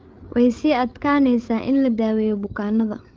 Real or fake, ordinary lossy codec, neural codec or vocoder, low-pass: fake; Opus, 32 kbps; codec, 16 kHz, 8 kbps, FreqCodec, larger model; 7.2 kHz